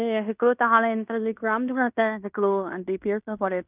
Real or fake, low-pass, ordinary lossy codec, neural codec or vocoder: fake; 3.6 kHz; none; codec, 16 kHz in and 24 kHz out, 0.9 kbps, LongCat-Audio-Codec, fine tuned four codebook decoder